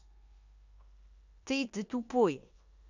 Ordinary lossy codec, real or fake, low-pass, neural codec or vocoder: none; fake; 7.2 kHz; codec, 16 kHz in and 24 kHz out, 0.9 kbps, LongCat-Audio-Codec, four codebook decoder